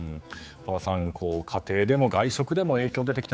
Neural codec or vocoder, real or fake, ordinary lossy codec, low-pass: codec, 16 kHz, 4 kbps, X-Codec, HuBERT features, trained on general audio; fake; none; none